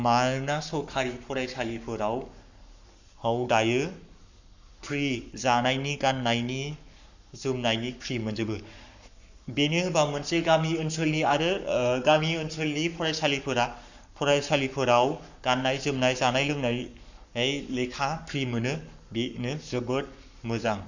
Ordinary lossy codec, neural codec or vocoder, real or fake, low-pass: none; codec, 44.1 kHz, 7.8 kbps, Pupu-Codec; fake; 7.2 kHz